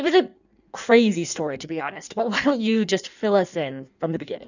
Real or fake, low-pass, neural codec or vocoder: fake; 7.2 kHz; codec, 16 kHz in and 24 kHz out, 1.1 kbps, FireRedTTS-2 codec